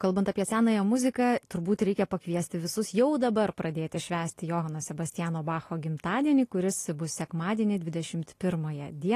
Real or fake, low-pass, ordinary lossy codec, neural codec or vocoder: real; 14.4 kHz; AAC, 48 kbps; none